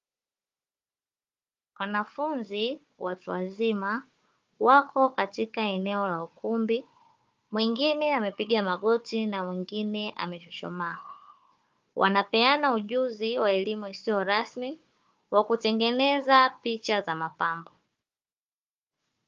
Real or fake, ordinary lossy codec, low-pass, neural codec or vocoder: fake; Opus, 32 kbps; 7.2 kHz; codec, 16 kHz, 4 kbps, FunCodec, trained on Chinese and English, 50 frames a second